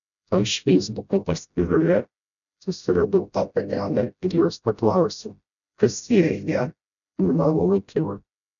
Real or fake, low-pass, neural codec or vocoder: fake; 7.2 kHz; codec, 16 kHz, 0.5 kbps, FreqCodec, smaller model